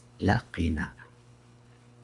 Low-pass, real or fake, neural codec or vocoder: 10.8 kHz; fake; codec, 24 kHz, 3 kbps, HILCodec